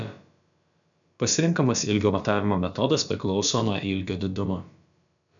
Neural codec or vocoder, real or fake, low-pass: codec, 16 kHz, about 1 kbps, DyCAST, with the encoder's durations; fake; 7.2 kHz